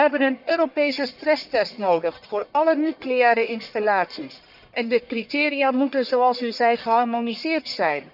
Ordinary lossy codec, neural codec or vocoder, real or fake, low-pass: none; codec, 44.1 kHz, 1.7 kbps, Pupu-Codec; fake; 5.4 kHz